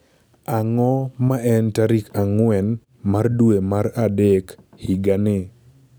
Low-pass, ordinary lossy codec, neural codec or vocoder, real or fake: none; none; none; real